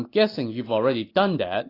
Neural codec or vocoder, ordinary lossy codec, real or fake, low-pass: none; AAC, 24 kbps; real; 5.4 kHz